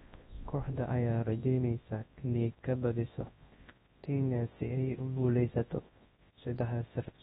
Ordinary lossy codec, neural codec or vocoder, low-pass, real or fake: AAC, 16 kbps; codec, 24 kHz, 0.9 kbps, WavTokenizer, large speech release; 10.8 kHz; fake